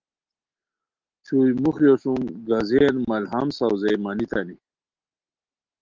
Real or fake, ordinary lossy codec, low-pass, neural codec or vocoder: real; Opus, 16 kbps; 7.2 kHz; none